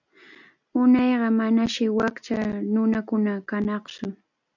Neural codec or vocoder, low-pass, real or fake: none; 7.2 kHz; real